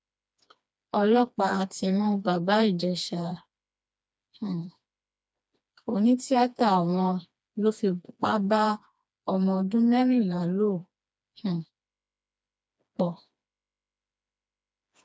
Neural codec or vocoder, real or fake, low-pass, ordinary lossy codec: codec, 16 kHz, 2 kbps, FreqCodec, smaller model; fake; none; none